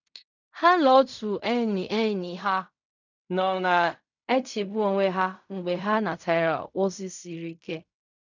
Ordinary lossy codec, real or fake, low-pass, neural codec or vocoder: none; fake; 7.2 kHz; codec, 16 kHz in and 24 kHz out, 0.4 kbps, LongCat-Audio-Codec, fine tuned four codebook decoder